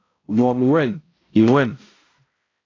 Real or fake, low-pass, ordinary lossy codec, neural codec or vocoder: fake; 7.2 kHz; AAC, 32 kbps; codec, 16 kHz, 0.5 kbps, X-Codec, HuBERT features, trained on balanced general audio